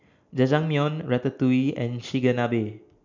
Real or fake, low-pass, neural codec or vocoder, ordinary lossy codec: real; 7.2 kHz; none; none